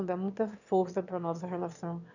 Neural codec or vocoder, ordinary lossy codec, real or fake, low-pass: autoencoder, 22.05 kHz, a latent of 192 numbers a frame, VITS, trained on one speaker; none; fake; 7.2 kHz